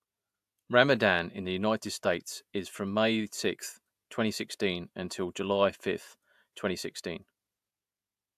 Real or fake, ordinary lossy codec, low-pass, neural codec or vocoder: fake; AAC, 96 kbps; 14.4 kHz; vocoder, 48 kHz, 128 mel bands, Vocos